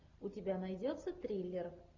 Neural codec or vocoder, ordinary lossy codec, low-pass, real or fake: none; MP3, 48 kbps; 7.2 kHz; real